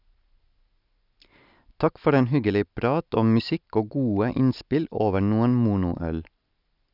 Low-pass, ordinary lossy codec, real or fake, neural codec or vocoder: 5.4 kHz; none; real; none